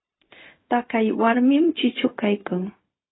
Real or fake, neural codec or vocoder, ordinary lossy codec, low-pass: fake; codec, 16 kHz, 0.4 kbps, LongCat-Audio-Codec; AAC, 16 kbps; 7.2 kHz